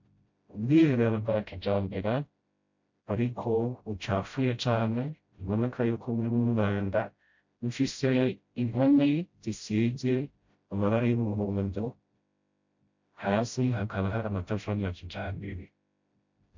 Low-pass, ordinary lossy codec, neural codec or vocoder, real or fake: 7.2 kHz; MP3, 48 kbps; codec, 16 kHz, 0.5 kbps, FreqCodec, smaller model; fake